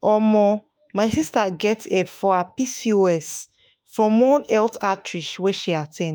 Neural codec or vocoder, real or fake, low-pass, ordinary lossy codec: autoencoder, 48 kHz, 32 numbers a frame, DAC-VAE, trained on Japanese speech; fake; none; none